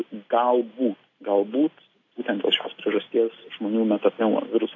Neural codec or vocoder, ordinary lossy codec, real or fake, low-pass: none; AAC, 32 kbps; real; 7.2 kHz